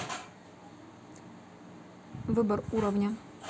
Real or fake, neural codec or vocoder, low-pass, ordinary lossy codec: real; none; none; none